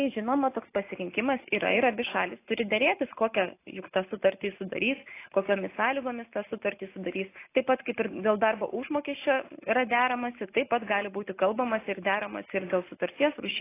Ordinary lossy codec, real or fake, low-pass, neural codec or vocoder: AAC, 24 kbps; real; 3.6 kHz; none